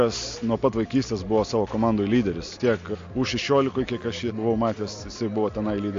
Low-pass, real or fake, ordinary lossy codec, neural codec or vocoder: 7.2 kHz; real; AAC, 48 kbps; none